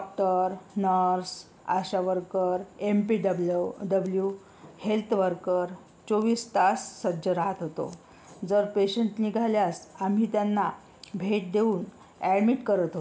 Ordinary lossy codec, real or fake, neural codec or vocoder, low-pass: none; real; none; none